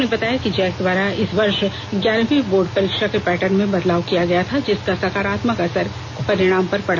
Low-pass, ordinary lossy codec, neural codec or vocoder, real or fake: none; none; none; real